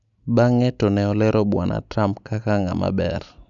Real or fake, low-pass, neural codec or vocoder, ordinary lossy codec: real; 7.2 kHz; none; none